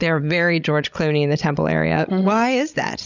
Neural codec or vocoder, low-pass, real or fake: codec, 16 kHz, 16 kbps, FreqCodec, larger model; 7.2 kHz; fake